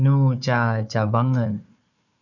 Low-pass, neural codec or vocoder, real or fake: 7.2 kHz; codec, 16 kHz, 4 kbps, FunCodec, trained on Chinese and English, 50 frames a second; fake